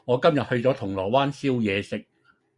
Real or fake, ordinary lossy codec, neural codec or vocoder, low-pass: real; Opus, 64 kbps; none; 10.8 kHz